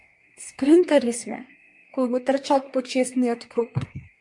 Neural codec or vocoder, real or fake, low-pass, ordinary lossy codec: codec, 24 kHz, 1 kbps, SNAC; fake; 10.8 kHz; MP3, 64 kbps